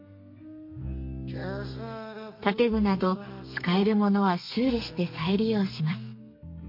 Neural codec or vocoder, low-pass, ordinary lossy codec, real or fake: codec, 32 kHz, 1.9 kbps, SNAC; 5.4 kHz; MP3, 32 kbps; fake